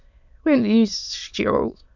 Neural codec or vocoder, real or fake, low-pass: autoencoder, 22.05 kHz, a latent of 192 numbers a frame, VITS, trained on many speakers; fake; 7.2 kHz